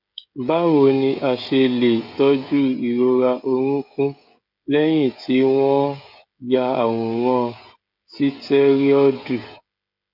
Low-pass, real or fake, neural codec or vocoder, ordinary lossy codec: 5.4 kHz; fake; codec, 16 kHz, 16 kbps, FreqCodec, smaller model; none